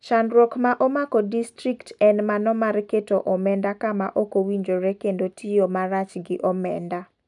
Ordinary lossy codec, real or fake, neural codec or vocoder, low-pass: none; real; none; 10.8 kHz